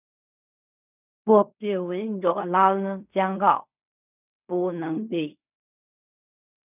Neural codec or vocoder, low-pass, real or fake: codec, 16 kHz in and 24 kHz out, 0.4 kbps, LongCat-Audio-Codec, fine tuned four codebook decoder; 3.6 kHz; fake